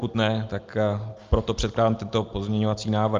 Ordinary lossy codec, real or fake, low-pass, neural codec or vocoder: Opus, 32 kbps; real; 7.2 kHz; none